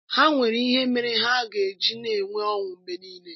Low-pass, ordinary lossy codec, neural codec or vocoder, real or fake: 7.2 kHz; MP3, 24 kbps; none; real